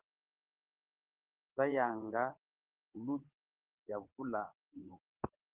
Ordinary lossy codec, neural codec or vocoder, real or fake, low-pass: Opus, 16 kbps; vocoder, 22.05 kHz, 80 mel bands, WaveNeXt; fake; 3.6 kHz